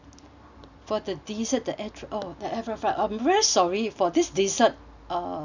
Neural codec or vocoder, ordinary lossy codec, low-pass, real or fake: none; none; 7.2 kHz; real